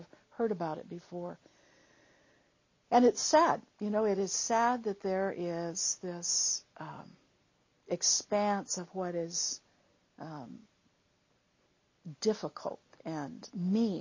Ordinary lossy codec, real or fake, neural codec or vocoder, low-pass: MP3, 32 kbps; real; none; 7.2 kHz